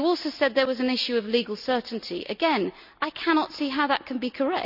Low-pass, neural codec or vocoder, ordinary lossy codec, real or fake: 5.4 kHz; none; none; real